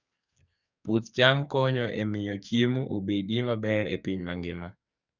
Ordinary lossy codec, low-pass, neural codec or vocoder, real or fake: none; 7.2 kHz; codec, 44.1 kHz, 2.6 kbps, SNAC; fake